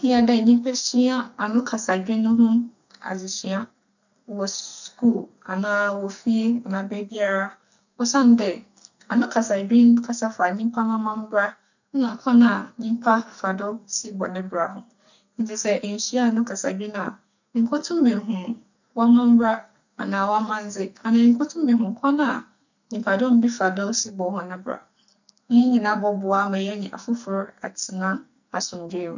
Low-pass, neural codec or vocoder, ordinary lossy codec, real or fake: 7.2 kHz; codec, 44.1 kHz, 2.6 kbps, SNAC; none; fake